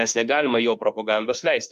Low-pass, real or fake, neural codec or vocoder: 14.4 kHz; fake; autoencoder, 48 kHz, 32 numbers a frame, DAC-VAE, trained on Japanese speech